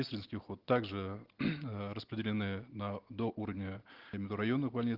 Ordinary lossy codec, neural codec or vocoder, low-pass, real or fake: Opus, 16 kbps; none; 5.4 kHz; real